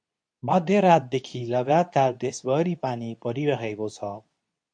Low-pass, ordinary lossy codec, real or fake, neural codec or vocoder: 9.9 kHz; MP3, 64 kbps; fake; codec, 24 kHz, 0.9 kbps, WavTokenizer, medium speech release version 2